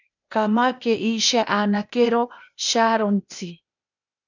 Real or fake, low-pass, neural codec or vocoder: fake; 7.2 kHz; codec, 16 kHz, 0.8 kbps, ZipCodec